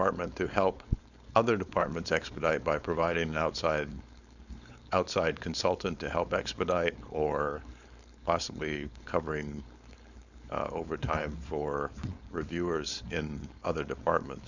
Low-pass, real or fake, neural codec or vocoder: 7.2 kHz; fake; codec, 16 kHz, 4.8 kbps, FACodec